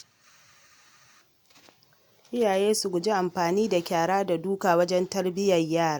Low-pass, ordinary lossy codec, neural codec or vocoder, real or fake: none; none; none; real